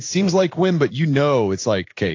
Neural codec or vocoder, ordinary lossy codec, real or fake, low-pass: codec, 16 kHz in and 24 kHz out, 1 kbps, XY-Tokenizer; AAC, 48 kbps; fake; 7.2 kHz